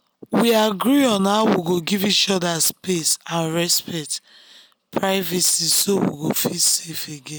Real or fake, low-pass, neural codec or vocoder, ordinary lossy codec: real; none; none; none